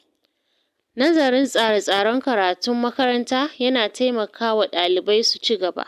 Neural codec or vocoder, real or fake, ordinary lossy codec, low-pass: none; real; AAC, 96 kbps; 14.4 kHz